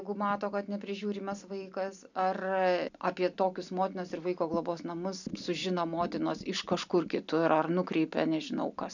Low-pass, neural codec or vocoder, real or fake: 7.2 kHz; none; real